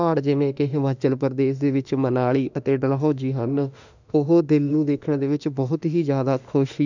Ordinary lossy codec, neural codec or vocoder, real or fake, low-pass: none; autoencoder, 48 kHz, 32 numbers a frame, DAC-VAE, trained on Japanese speech; fake; 7.2 kHz